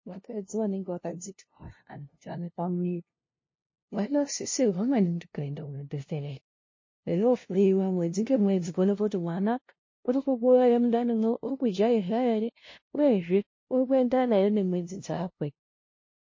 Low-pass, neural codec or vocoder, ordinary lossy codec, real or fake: 7.2 kHz; codec, 16 kHz, 0.5 kbps, FunCodec, trained on LibriTTS, 25 frames a second; MP3, 32 kbps; fake